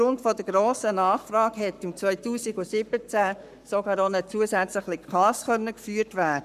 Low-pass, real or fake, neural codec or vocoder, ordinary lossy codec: 14.4 kHz; fake; codec, 44.1 kHz, 7.8 kbps, Pupu-Codec; none